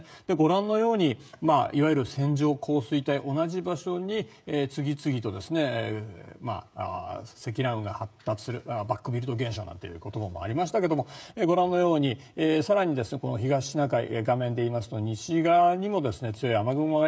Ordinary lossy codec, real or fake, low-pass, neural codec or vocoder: none; fake; none; codec, 16 kHz, 16 kbps, FreqCodec, smaller model